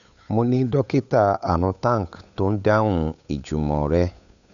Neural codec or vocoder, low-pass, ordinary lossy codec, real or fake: codec, 16 kHz, 8 kbps, FunCodec, trained on Chinese and English, 25 frames a second; 7.2 kHz; none; fake